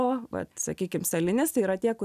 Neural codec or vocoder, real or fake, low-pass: none; real; 14.4 kHz